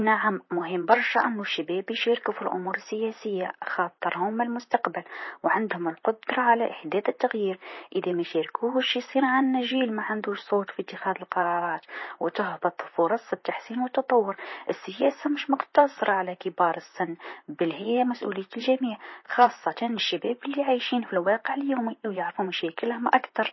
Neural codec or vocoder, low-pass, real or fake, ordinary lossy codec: vocoder, 44.1 kHz, 128 mel bands, Pupu-Vocoder; 7.2 kHz; fake; MP3, 24 kbps